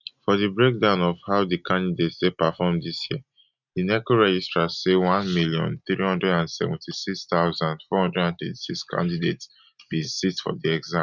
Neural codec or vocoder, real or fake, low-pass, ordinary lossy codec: none; real; 7.2 kHz; none